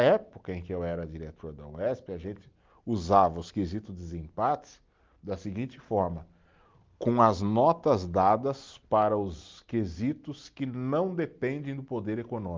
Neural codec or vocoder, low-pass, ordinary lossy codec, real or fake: none; 7.2 kHz; Opus, 32 kbps; real